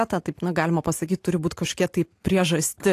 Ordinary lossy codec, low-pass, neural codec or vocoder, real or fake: AAC, 64 kbps; 14.4 kHz; none; real